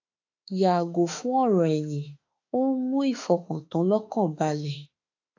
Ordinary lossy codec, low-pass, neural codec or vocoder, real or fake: AAC, 48 kbps; 7.2 kHz; autoencoder, 48 kHz, 32 numbers a frame, DAC-VAE, trained on Japanese speech; fake